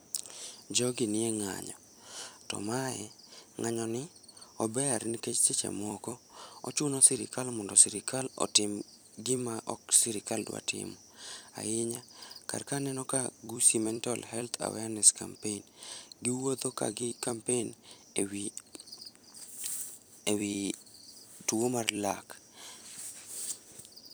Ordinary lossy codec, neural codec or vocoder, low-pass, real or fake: none; vocoder, 44.1 kHz, 128 mel bands every 256 samples, BigVGAN v2; none; fake